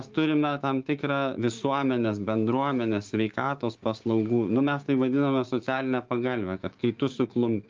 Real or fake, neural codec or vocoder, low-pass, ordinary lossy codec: fake; codec, 16 kHz, 6 kbps, DAC; 7.2 kHz; Opus, 24 kbps